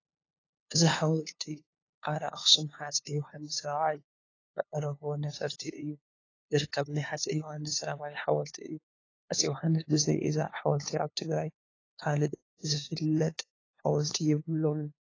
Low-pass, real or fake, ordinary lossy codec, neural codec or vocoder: 7.2 kHz; fake; AAC, 32 kbps; codec, 16 kHz, 2 kbps, FunCodec, trained on LibriTTS, 25 frames a second